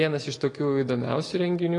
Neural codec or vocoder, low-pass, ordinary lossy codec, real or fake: none; 10.8 kHz; AAC, 32 kbps; real